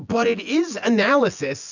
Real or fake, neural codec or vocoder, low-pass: fake; vocoder, 24 kHz, 100 mel bands, Vocos; 7.2 kHz